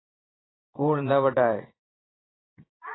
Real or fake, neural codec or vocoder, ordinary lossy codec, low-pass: fake; vocoder, 22.05 kHz, 80 mel bands, Vocos; AAC, 16 kbps; 7.2 kHz